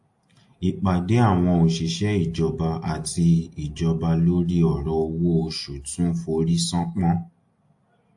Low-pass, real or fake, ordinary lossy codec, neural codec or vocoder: 10.8 kHz; real; AAC, 64 kbps; none